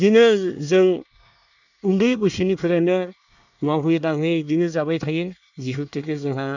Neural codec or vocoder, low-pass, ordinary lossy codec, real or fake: codec, 24 kHz, 1 kbps, SNAC; 7.2 kHz; none; fake